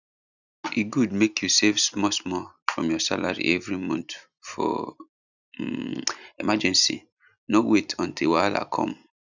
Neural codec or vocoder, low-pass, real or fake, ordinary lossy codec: none; 7.2 kHz; real; none